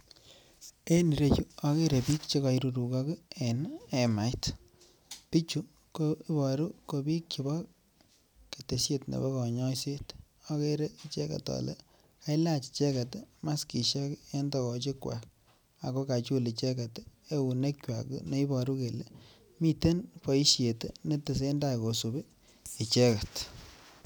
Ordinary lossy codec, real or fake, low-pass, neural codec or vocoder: none; real; none; none